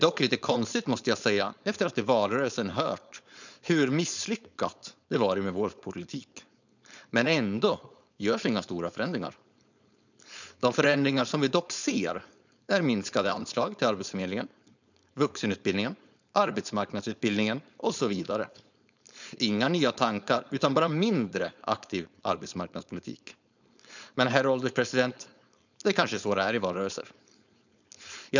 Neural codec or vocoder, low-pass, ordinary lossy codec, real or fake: codec, 16 kHz, 4.8 kbps, FACodec; 7.2 kHz; none; fake